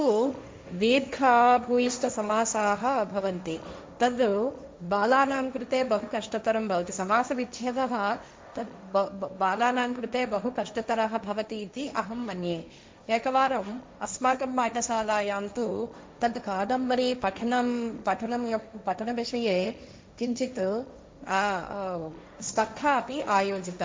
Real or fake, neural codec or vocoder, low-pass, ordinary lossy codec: fake; codec, 16 kHz, 1.1 kbps, Voila-Tokenizer; none; none